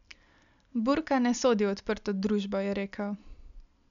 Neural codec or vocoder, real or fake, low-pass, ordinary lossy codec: none; real; 7.2 kHz; none